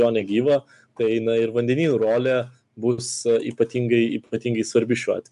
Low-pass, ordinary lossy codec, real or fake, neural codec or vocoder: 10.8 kHz; AAC, 96 kbps; real; none